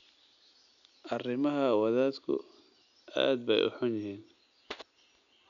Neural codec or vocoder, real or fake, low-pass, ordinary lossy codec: none; real; 7.2 kHz; none